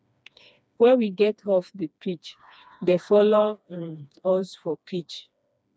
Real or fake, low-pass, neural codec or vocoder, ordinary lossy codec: fake; none; codec, 16 kHz, 2 kbps, FreqCodec, smaller model; none